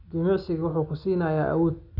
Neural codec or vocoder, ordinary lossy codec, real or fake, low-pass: none; none; real; 5.4 kHz